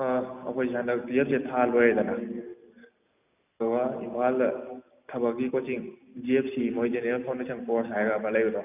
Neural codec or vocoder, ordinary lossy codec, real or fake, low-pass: none; none; real; 3.6 kHz